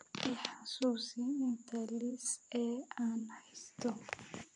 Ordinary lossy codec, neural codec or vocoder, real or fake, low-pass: none; vocoder, 24 kHz, 100 mel bands, Vocos; fake; 10.8 kHz